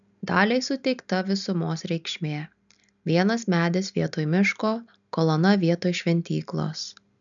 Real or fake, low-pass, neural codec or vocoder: real; 7.2 kHz; none